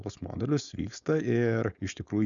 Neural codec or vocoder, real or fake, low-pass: codec, 16 kHz, 4.8 kbps, FACodec; fake; 7.2 kHz